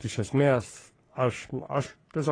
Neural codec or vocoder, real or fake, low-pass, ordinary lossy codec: codec, 44.1 kHz, 3.4 kbps, Pupu-Codec; fake; 9.9 kHz; AAC, 32 kbps